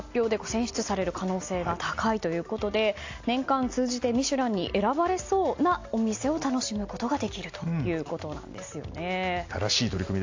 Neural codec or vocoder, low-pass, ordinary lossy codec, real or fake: none; 7.2 kHz; AAC, 48 kbps; real